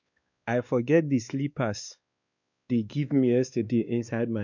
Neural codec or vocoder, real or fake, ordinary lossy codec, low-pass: codec, 16 kHz, 2 kbps, X-Codec, WavLM features, trained on Multilingual LibriSpeech; fake; none; 7.2 kHz